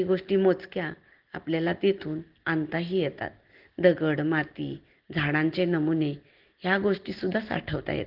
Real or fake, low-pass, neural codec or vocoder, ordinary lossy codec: real; 5.4 kHz; none; Opus, 16 kbps